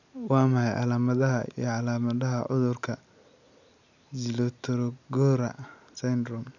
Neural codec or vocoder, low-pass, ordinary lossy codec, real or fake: none; 7.2 kHz; none; real